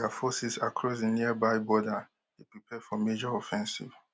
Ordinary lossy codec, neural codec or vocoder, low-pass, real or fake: none; none; none; real